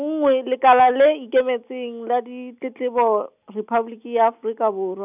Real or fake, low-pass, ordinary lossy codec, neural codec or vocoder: real; 3.6 kHz; none; none